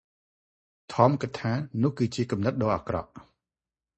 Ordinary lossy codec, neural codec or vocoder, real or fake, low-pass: MP3, 32 kbps; none; real; 10.8 kHz